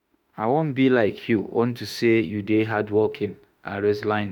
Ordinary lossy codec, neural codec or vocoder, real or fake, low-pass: none; autoencoder, 48 kHz, 32 numbers a frame, DAC-VAE, trained on Japanese speech; fake; 19.8 kHz